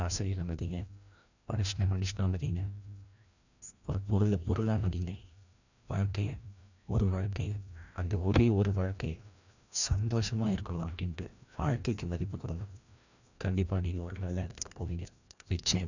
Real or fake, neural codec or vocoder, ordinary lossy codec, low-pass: fake; codec, 16 kHz, 1 kbps, FreqCodec, larger model; none; 7.2 kHz